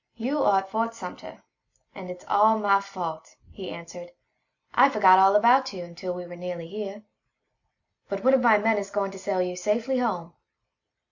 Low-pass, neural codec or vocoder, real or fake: 7.2 kHz; none; real